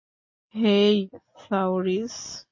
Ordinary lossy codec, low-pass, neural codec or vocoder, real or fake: MP3, 32 kbps; 7.2 kHz; none; real